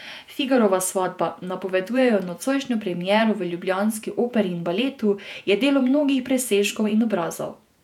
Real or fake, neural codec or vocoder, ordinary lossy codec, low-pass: fake; vocoder, 48 kHz, 128 mel bands, Vocos; none; 19.8 kHz